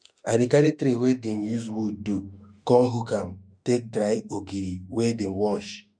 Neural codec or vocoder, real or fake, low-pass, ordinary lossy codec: autoencoder, 48 kHz, 32 numbers a frame, DAC-VAE, trained on Japanese speech; fake; 9.9 kHz; none